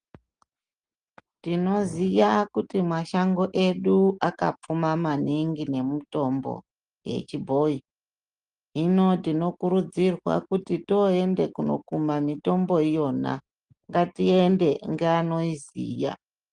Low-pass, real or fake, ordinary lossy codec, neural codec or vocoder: 9.9 kHz; real; Opus, 24 kbps; none